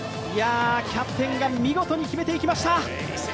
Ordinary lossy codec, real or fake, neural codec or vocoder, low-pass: none; real; none; none